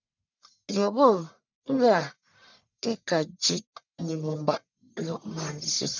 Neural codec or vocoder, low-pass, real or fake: codec, 44.1 kHz, 1.7 kbps, Pupu-Codec; 7.2 kHz; fake